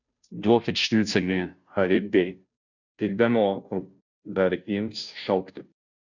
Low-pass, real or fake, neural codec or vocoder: 7.2 kHz; fake; codec, 16 kHz, 0.5 kbps, FunCodec, trained on Chinese and English, 25 frames a second